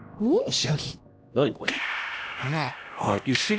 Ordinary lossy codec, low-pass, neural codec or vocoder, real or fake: none; none; codec, 16 kHz, 1 kbps, X-Codec, HuBERT features, trained on LibriSpeech; fake